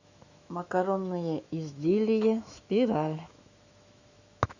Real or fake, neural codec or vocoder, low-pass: fake; autoencoder, 48 kHz, 128 numbers a frame, DAC-VAE, trained on Japanese speech; 7.2 kHz